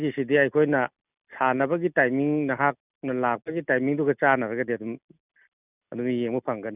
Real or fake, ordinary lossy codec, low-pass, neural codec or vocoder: real; none; 3.6 kHz; none